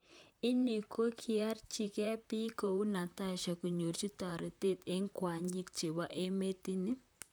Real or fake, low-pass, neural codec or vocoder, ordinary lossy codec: fake; none; vocoder, 44.1 kHz, 128 mel bands, Pupu-Vocoder; none